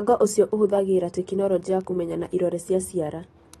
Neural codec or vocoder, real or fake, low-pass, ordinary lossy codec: none; real; 14.4 kHz; AAC, 32 kbps